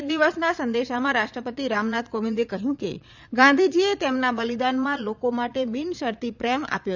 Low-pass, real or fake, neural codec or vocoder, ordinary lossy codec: 7.2 kHz; fake; vocoder, 22.05 kHz, 80 mel bands, Vocos; none